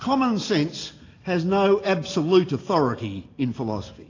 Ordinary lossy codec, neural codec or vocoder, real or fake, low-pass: AAC, 32 kbps; none; real; 7.2 kHz